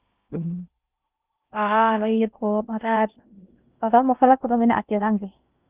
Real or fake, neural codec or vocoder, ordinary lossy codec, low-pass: fake; codec, 16 kHz in and 24 kHz out, 0.6 kbps, FocalCodec, streaming, 2048 codes; Opus, 24 kbps; 3.6 kHz